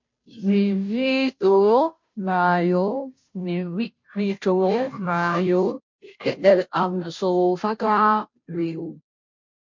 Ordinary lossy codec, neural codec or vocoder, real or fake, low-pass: MP3, 48 kbps; codec, 16 kHz, 0.5 kbps, FunCodec, trained on Chinese and English, 25 frames a second; fake; 7.2 kHz